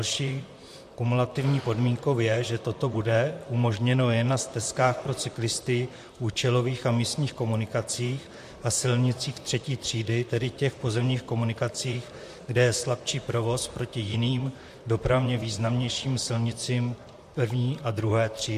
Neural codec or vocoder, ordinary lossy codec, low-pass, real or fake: vocoder, 44.1 kHz, 128 mel bands, Pupu-Vocoder; MP3, 64 kbps; 14.4 kHz; fake